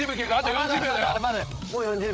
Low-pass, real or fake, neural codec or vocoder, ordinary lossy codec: none; fake; codec, 16 kHz, 8 kbps, FreqCodec, larger model; none